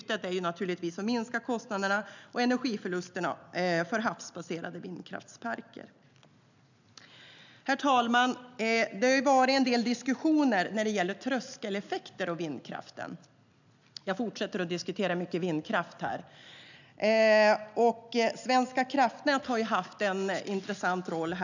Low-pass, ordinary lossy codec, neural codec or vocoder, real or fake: 7.2 kHz; none; none; real